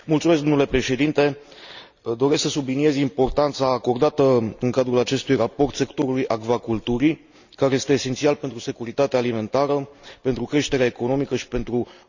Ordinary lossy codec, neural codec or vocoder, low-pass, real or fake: none; none; 7.2 kHz; real